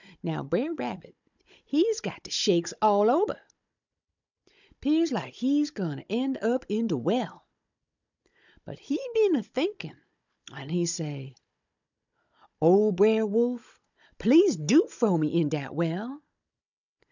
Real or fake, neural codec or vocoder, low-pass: fake; codec, 16 kHz, 16 kbps, FunCodec, trained on Chinese and English, 50 frames a second; 7.2 kHz